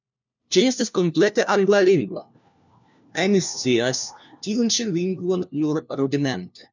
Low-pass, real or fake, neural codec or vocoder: 7.2 kHz; fake; codec, 16 kHz, 1 kbps, FunCodec, trained on LibriTTS, 50 frames a second